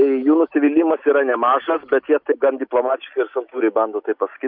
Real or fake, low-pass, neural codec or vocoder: real; 5.4 kHz; none